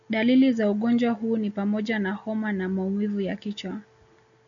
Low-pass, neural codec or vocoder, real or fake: 7.2 kHz; none; real